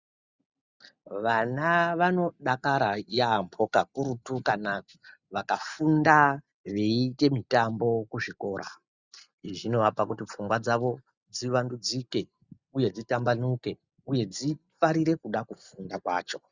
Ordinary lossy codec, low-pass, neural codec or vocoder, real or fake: Opus, 64 kbps; 7.2 kHz; none; real